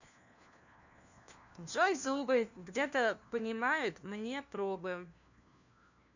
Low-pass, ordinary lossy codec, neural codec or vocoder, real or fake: 7.2 kHz; none; codec, 16 kHz, 1 kbps, FunCodec, trained on LibriTTS, 50 frames a second; fake